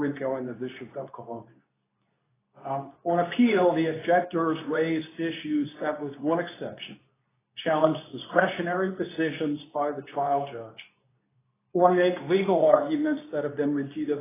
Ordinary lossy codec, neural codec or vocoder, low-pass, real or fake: AAC, 16 kbps; codec, 24 kHz, 0.9 kbps, WavTokenizer, medium speech release version 2; 3.6 kHz; fake